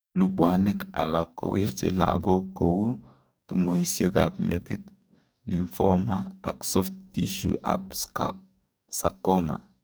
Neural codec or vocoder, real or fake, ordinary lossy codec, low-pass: codec, 44.1 kHz, 2.6 kbps, DAC; fake; none; none